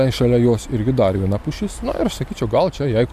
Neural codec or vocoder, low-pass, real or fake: none; 14.4 kHz; real